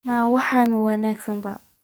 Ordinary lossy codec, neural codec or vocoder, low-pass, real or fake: none; codec, 44.1 kHz, 2.6 kbps, SNAC; none; fake